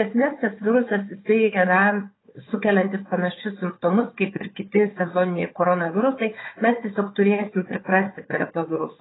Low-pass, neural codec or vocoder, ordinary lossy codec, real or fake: 7.2 kHz; codec, 16 kHz, 4 kbps, FunCodec, trained on Chinese and English, 50 frames a second; AAC, 16 kbps; fake